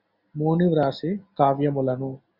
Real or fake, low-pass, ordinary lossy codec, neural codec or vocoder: real; 5.4 kHz; Opus, 64 kbps; none